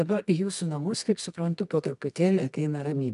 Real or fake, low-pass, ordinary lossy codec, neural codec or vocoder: fake; 10.8 kHz; MP3, 96 kbps; codec, 24 kHz, 0.9 kbps, WavTokenizer, medium music audio release